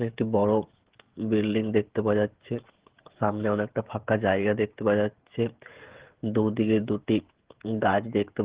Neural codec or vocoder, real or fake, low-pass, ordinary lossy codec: codec, 16 kHz, 16 kbps, FreqCodec, smaller model; fake; 3.6 kHz; Opus, 16 kbps